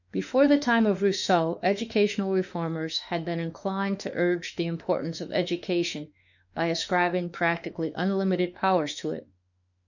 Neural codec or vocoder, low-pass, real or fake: autoencoder, 48 kHz, 32 numbers a frame, DAC-VAE, trained on Japanese speech; 7.2 kHz; fake